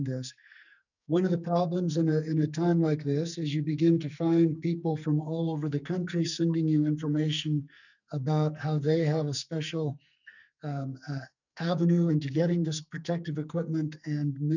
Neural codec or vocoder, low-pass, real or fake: codec, 44.1 kHz, 2.6 kbps, SNAC; 7.2 kHz; fake